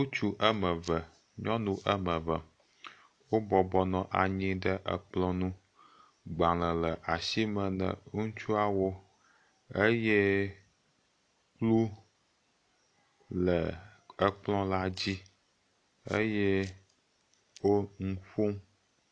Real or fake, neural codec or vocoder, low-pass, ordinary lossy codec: real; none; 9.9 kHz; AAC, 48 kbps